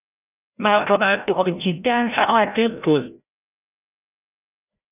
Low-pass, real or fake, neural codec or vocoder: 3.6 kHz; fake; codec, 16 kHz, 0.5 kbps, FreqCodec, larger model